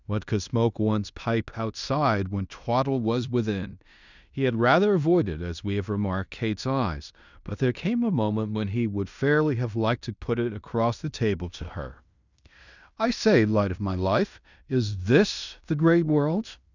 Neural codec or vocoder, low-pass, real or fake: codec, 16 kHz in and 24 kHz out, 0.9 kbps, LongCat-Audio-Codec, fine tuned four codebook decoder; 7.2 kHz; fake